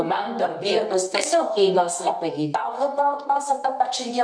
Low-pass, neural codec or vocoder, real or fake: 9.9 kHz; codec, 24 kHz, 0.9 kbps, WavTokenizer, medium music audio release; fake